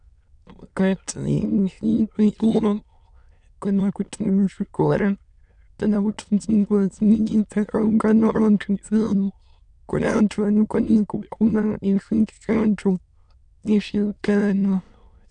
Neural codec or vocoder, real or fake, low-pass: autoencoder, 22.05 kHz, a latent of 192 numbers a frame, VITS, trained on many speakers; fake; 9.9 kHz